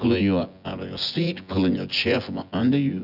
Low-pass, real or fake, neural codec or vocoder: 5.4 kHz; fake; vocoder, 24 kHz, 100 mel bands, Vocos